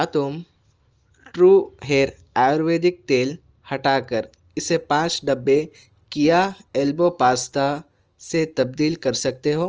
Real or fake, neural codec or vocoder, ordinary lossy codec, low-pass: real; none; Opus, 24 kbps; 7.2 kHz